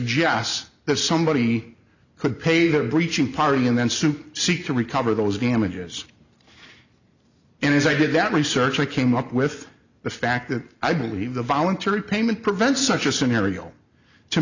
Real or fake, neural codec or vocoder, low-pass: real; none; 7.2 kHz